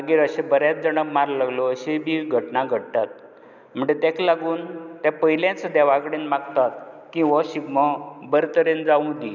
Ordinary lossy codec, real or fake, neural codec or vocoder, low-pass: none; real; none; 7.2 kHz